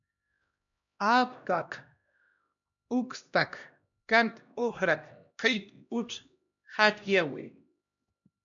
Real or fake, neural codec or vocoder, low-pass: fake; codec, 16 kHz, 1 kbps, X-Codec, HuBERT features, trained on LibriSpeech; 7.2 kHz